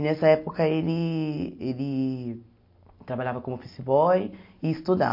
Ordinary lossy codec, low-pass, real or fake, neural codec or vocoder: MP3, 32 kbps; 5.4 kHz; real; none